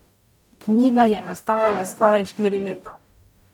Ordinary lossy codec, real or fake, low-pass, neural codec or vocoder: none; fake; 19.8 kHz; codec, 44.1 kHz, 0.9 kbps, DAC